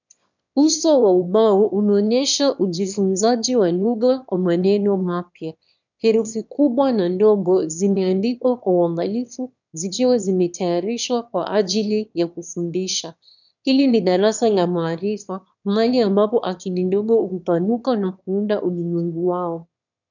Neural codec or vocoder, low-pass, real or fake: autoencoder, 22.05 kHz, a latent of 192 numbers a frame, VITS, trained on one speaker; 7.2 kHz; fake